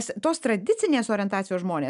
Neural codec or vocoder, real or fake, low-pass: none; real; 10.8 kHz